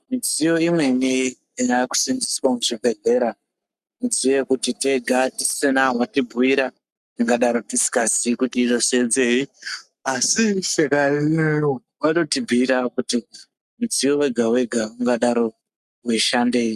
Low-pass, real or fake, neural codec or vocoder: 14.4 kHz; fake; codec, 44.1 kHz, 7.8 kbps, Pupu-Codec